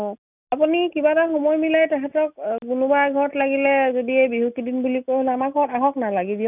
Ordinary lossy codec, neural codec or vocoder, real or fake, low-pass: none; none; real; 3.6 kHz